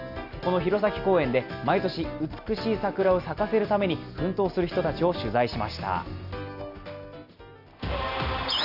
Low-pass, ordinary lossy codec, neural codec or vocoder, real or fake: 5.4 kHz; none; none; real